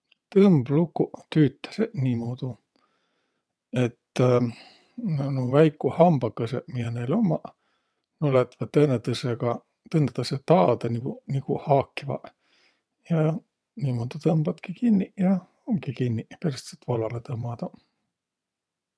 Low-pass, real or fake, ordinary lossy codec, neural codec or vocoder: none; fake; none; vocoder, 22.05 kHz, 80 mel bands, WaveNeXt